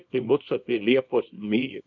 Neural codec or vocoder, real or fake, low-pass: codec, 24 kHz, 0.9 kbps, WavTokenizer, small release; fake; 7.2 kHz